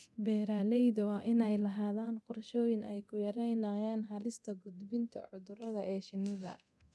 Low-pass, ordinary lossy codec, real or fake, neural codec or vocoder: none; none; fake; codec, 24 kHz, 0.9 kbps, DualCodec